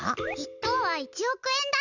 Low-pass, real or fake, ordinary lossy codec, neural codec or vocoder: 7.2 kHz; real; none; none